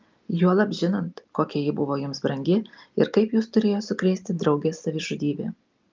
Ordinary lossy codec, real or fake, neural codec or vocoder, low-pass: Opus, 24 kbps; real; none; 7.2 kHz